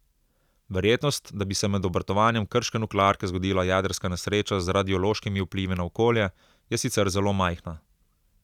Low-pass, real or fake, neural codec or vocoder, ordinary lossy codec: 19.8 kHz; real; none; none